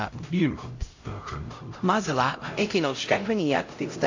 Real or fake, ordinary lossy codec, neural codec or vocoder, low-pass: fake; AAC, 48 kbps; codec, 16 kHz, 0.5 kbps, X-Codec, HuBERT features, trained on LibriSpeech; 7.2 kHz